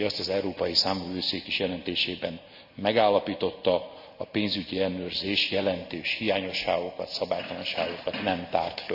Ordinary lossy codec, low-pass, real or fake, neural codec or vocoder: none; 5.4 kHz; real; none